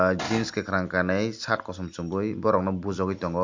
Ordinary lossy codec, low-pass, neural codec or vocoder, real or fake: MP3, 48 kbps; 7.2 kHz; none; real